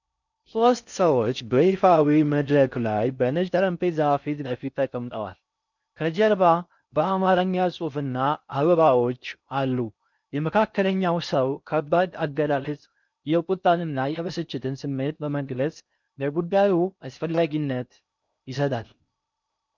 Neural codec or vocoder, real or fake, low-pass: codec, 16 kHz in and 24 kHz out, 0.6 kbps, FocalCodec, streaming, 4096 codes; fake; 7.2 kHz